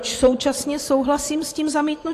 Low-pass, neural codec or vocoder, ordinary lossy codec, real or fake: 14.4 kHz; vocoder, 44.1 kHz, 128 mel bands every 512 samples, BigVGAN v2; AAC, 64 kbps; fake